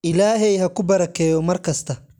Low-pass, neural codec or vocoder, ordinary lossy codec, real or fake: 19.8 kHz; none; none; real